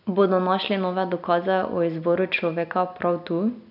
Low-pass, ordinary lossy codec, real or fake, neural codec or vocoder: 5.4 kHz; none; real; none